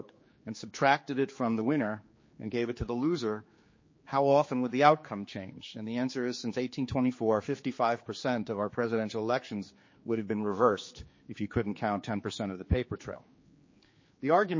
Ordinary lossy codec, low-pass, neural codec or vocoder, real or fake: MP3, 32 kbps; 7.2 kHz; codec, 16 kHz, 4 kbps, X-Codec, HuBERT features, trained on general audio; fake